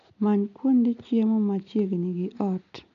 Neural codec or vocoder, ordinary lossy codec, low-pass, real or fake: none; none; 7.2 kHz; real